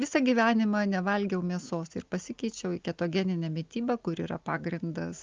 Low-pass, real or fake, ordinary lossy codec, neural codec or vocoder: 7.2 kHz; real; Opus, 32 kbps; none